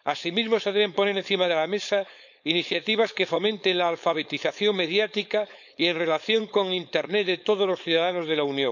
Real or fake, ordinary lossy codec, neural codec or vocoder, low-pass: fake; none; codec, 16 kHz, 4.8 kbps, FACodec; 7.2 kHz